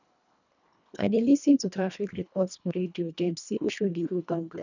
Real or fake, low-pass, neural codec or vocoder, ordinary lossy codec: fake; 7.2 kHz; codec, 24 kHz, 1.5 kbps, HILCodec; none